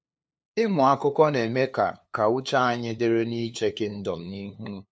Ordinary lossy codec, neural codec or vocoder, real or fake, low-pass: none; codec, 16 kHz, 2 kbps, FunCodec, trained on LibriTTS, 25 frames a second; fake; none